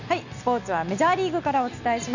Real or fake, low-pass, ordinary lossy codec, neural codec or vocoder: real; 7.2 kHz; none; none